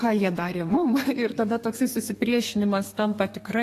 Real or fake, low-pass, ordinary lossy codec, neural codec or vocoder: fake; 14.4 kHz; AAC, 48 kbps; codec, 32 kHz, 1.9 kbps, SNAC